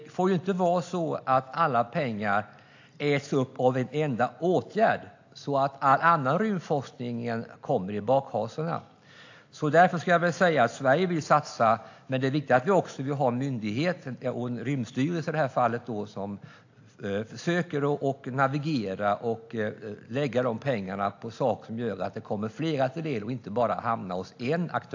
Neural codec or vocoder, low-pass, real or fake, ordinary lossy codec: none; 7.2 kHz; real; AAC, 48 kbps